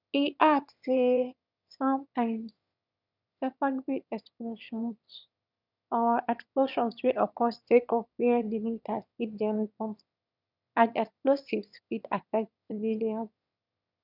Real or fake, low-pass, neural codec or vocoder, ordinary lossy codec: fake; 5.4 kHz; autoencoder, 22.05 kHz, a latent of 192 numbers a frame, VITS, trained on one speaker; none